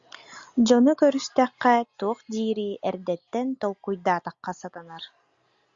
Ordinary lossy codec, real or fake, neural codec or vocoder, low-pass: Opus, 64 kbps; real; none; 7.2 kHz